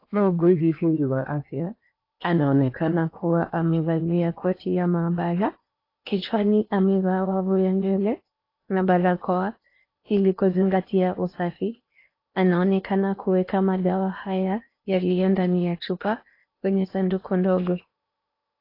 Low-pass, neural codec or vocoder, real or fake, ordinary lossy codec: 5.4 kHz; codec, 16 kHz in and 24 kHz out, 0.8 kbps, FocalCodec, streaming, 65536 codes; fake; AAC, 32 kbps